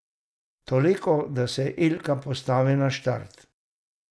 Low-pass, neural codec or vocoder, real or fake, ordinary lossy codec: none; none; real; none